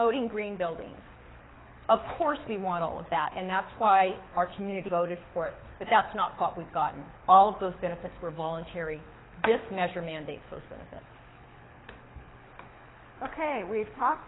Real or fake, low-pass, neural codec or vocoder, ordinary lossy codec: fake; 7.2 kHz; codec, 24 kHz, 6 kbps, HILCodec; AAC, 16 kbps